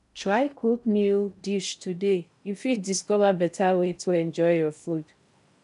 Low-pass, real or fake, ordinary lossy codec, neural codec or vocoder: 10.8 kHz; fake; none; codec, 16 kHz in and 24 kHz out, 0.6 kbps, FocalCodec, streaming, 4096 codes